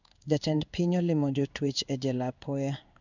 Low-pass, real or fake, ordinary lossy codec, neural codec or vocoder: 7.2 kHz; fake; none; codec, 16 kHz in and 24 kHz out, 1 kbps, XY-Tokenizer